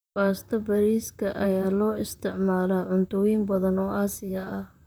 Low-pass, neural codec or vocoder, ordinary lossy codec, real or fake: none; vocoder, 44.1 kHz, 128 mel bands, Pupu-Vocoder; none; fake